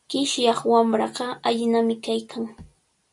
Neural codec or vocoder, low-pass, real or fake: none; 10.8 kHz; real